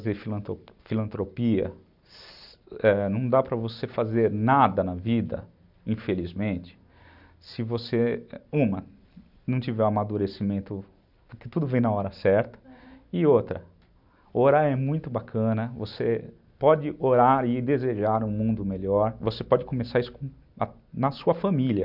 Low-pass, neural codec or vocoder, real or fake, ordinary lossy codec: 5.4 kHz; none; real; none